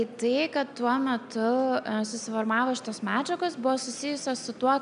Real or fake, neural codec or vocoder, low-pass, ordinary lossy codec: real; none; 9.9 kHz; MP3, 96 kbps